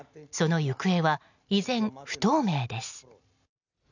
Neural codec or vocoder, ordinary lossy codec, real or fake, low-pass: none; none; real; 7.2 kHz